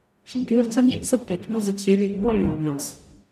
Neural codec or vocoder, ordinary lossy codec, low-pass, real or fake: codec, 44.1 kHz, 0.9 kbps, DAC; none; 14.4 kHz; fake